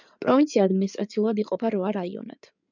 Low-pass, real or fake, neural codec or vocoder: 7.2 kHz; fake; codec, 16 kHz in and 24 kHz out, 2.2 kbps, FireRedTTS-2 codec